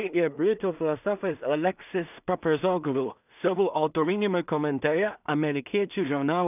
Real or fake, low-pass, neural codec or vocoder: fake; 3.6 kHz; codec, 16 kHz in and 24 kHz out, 0.4 kbps, LongCat-Audio-Codec, two codebook decoder